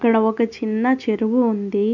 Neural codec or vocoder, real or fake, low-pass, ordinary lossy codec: none; real; 7.2 kHz; none